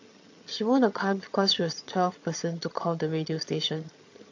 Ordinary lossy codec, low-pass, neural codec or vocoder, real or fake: AAC, 48 kbps; 7.2 kHz; vocoder, 22.05 kHz, 80 mel bands, HiFi-GAN; fake